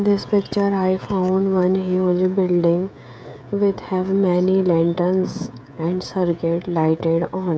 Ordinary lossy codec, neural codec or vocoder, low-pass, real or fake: none; codec, 16 kHz, 16 kbps, FreqCodec, smaller model; none; fake